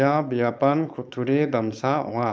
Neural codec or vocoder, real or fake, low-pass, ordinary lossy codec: codec, 16 kHz, 4.8 kbps, FACodec; fake; none; none